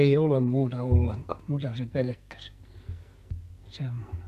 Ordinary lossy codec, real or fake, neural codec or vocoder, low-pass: none; fake; codec, 44.1 kHz, 2.6 kbps, SNAC; 14.4 kHz